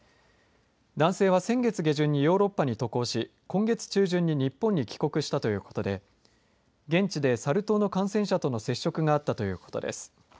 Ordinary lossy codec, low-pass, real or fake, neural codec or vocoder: none; none; real; none